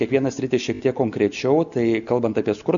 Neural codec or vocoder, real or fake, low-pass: none; real; 7.2 kHz